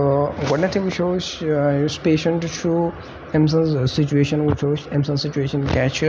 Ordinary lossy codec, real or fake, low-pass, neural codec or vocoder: none; real; none; none